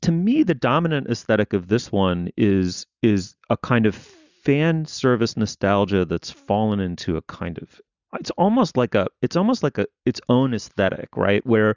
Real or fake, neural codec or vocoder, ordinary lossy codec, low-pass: real; none; Opus, 64 kbps; 7.2 kHz